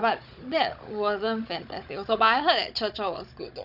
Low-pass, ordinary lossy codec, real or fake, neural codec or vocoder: 5.4 kHz; none; fake; codec, 16 kHz, 16 kbps, FunCodec, trained on Chinese and English, 50 frames a second